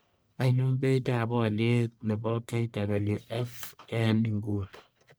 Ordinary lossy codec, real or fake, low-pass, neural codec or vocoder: none; fake; none; codec, 44.1 kHz, 1.7 kbps, Pupu-Codec